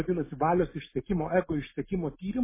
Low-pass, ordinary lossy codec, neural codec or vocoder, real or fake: 3.6 kHz; MP3, 16 kbps; none; real